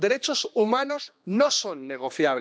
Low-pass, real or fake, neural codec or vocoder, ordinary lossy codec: none; fake; codec, 16 kHz, 2 kbps, X-Codec, HuBERT features, trained on general audio; none